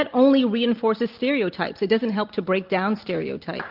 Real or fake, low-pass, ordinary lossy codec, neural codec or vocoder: real; 5.4 kHz; Opus, 24 kbps; none